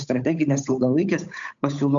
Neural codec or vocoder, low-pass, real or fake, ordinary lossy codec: codec, 16 kHz, 8 kbps, FunCodec, trained on Chinese and English, 25 frames a second; 7.2 kHz; fake; MP3, 64 kbps